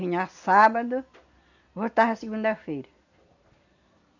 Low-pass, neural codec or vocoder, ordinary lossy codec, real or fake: 7.2 kHz; none; none; real